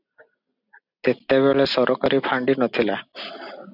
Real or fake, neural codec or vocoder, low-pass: real; none; 5.4 kHz